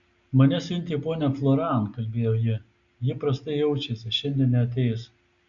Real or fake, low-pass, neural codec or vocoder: real; 7.2 kHz; none